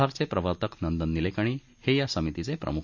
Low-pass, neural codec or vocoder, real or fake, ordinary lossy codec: 7.2 kHz; none; real; none